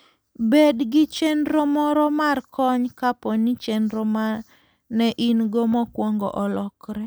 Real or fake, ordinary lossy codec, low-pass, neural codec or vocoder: real; none; none; none